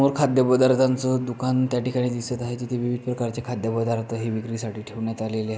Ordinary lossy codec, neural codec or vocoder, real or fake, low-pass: none; none; real; none